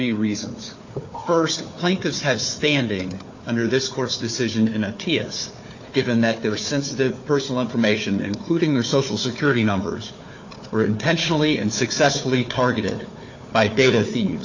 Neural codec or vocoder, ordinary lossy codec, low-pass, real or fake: codec, 16 kHz, 4 kbps, FunCodec, trained on Chinese and English, 50 frames a second; AAC, 48 kbps; 7.2 kHz; fake